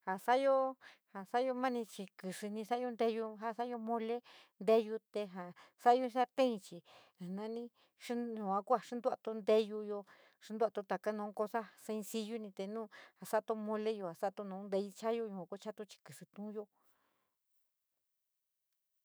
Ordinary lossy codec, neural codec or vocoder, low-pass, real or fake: none; autoencoder, 48 kHz, 128 numbers a frame, DAC-VAE, trained on Japanese speech; none; fake